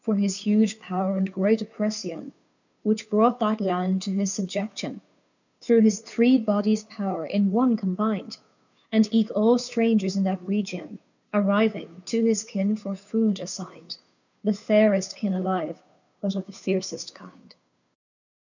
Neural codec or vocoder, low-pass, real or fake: codec, 16 kHz, 2 kbps, FunCodec, trained on Chinese and English, 25 frames a second; 7.2 kHz; fake